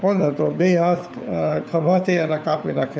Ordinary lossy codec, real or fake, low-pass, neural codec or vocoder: none; fake; none; codec, 16 kHz, 4 kbps, FunCodec, trained on LibriTTS, 50 frames a second